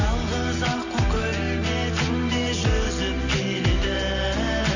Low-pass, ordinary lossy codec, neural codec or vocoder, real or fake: 7.2 kHz; none; none; real